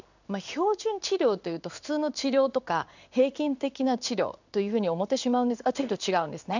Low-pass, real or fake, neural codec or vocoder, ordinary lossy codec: 7.2 kHz; fake; codec, 16 kHz in and 24 kHz out, 1 kbps, XY-Tokenizer; none